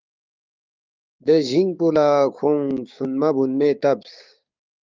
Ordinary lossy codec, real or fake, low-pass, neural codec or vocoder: Opus, 24 kbps; fake; 7.2 kHz; codec, 16 kHz, 6 kbps, DAC